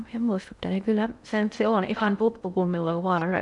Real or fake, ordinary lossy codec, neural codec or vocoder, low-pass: fake; none; codec, 16 kHz in and 24 kHz out, 0.6 kbps, FocalCodec, streaming, 2048 codes; 10.8 kHz